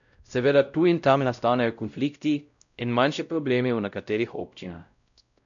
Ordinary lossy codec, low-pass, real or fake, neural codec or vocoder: none; 7.2 kHz; fake; codec, 16 kHz, 0.5 kbps, X-Codec, WavLM features, trained on Multilingual LibriSpeech